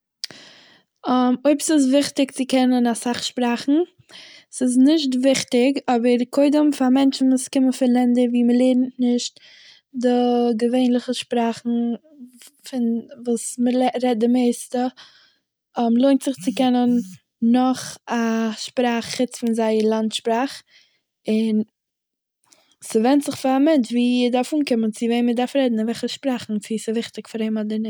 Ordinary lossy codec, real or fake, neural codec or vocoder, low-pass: none; real; none; none